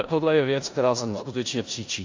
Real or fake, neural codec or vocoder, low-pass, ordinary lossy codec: fake; codec, 16 kHz in and 24 kHz out, 0.9 kbps, LongCat-Audio-Codec, four codebook decoder; 7.2 kHz; AAC, 48 kbps